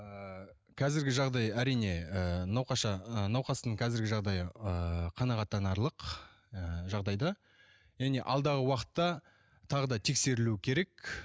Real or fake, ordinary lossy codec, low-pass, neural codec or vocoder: real; none; none; none